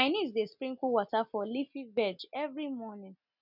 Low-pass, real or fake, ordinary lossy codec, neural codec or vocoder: 5.4 kHz; real; none; none